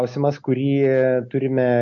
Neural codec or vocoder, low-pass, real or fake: none; 7.2 kHz; real